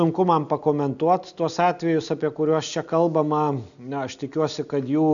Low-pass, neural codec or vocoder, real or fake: 7.2 kHz; none; real